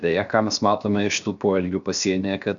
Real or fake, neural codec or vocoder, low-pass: fake; codec, 16 kHz, 0.7 kbps, FocalCodec; 7.2 kHz